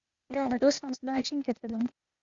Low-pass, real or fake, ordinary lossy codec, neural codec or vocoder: 7.2 kHz; fake; Opus, 64 kbps; codec, 16 kHz, 0.8 kbps, ZipCodec